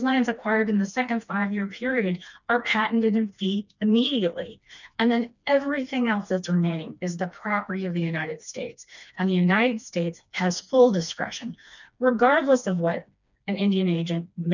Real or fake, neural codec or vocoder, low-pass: fake; codec, 16 kHz, 2 kbps, FreqCodec, smaller model; 7.2 kHz